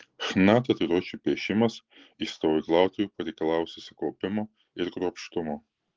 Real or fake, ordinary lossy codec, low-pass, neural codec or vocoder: real; Opus, 16 kbps; 7.2 kHz; none